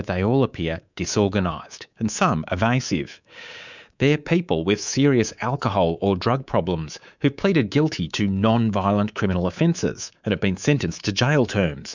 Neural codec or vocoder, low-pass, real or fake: codec, 24 kHz, 3.1 kbps, DualCodec; 7.2 kHz; fake